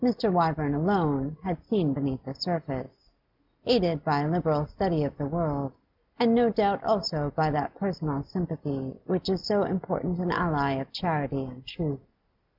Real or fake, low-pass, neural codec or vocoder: real; 5.4 kHz; none